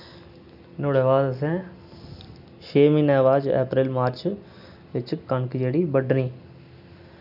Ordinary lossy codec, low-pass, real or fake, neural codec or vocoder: none; 5.4 kHz; real; none